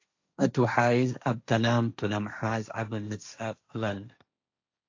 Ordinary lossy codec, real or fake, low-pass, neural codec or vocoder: AAC, 48 kbps; fake; 7.2 kHz; codec, 16 kHz, 1.1 kbps, Voila-Tokenizer